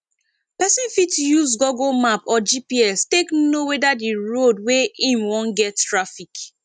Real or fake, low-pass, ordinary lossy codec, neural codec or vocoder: real; 9.9 kHz; none; none